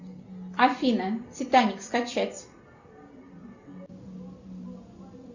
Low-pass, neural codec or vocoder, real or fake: 7.2 kHz; none; real